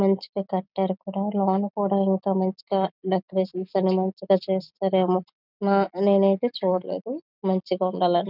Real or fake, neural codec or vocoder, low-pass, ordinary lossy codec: real; none; 5.4 kHz; none